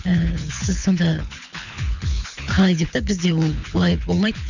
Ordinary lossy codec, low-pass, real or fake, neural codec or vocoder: none; 7.2 kHz; fake; codec, 24 kHz, 6 kbps, HILCodec